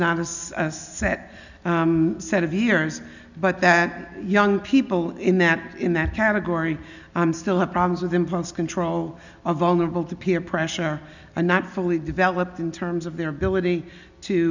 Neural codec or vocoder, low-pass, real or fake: none; 7.2 kHz; real